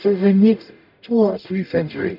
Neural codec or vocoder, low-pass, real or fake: codec, 44.1 kHz, 0.9 kbps, DAC; 5.4 kHz; fake